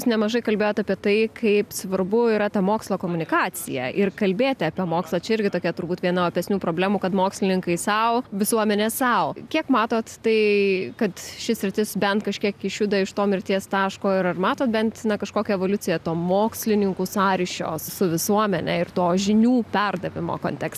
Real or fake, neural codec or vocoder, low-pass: real; none; 14.4 kHz